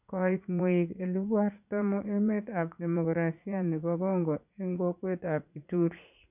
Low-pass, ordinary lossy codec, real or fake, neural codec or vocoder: 3.6 kHz; none; fake; vocoder, 44.1 kHz, 80 mel bands, Vocos